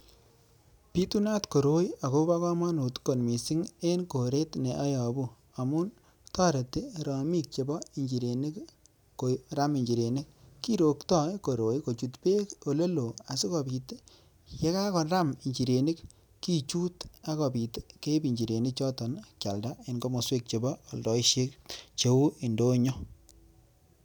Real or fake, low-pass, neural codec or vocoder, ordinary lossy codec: real; none; none; none